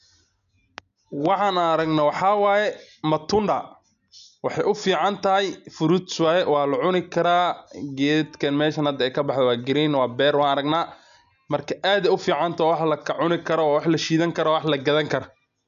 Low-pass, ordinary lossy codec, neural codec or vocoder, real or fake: 7.2 kHz; none; none; real